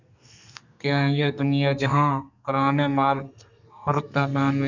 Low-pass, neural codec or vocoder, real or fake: 7.2 kHz; codec, 32 kHz, 1.9 kbps, SNAC; fake